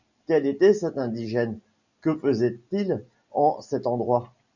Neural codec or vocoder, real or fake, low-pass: none; real; 7.2 kHz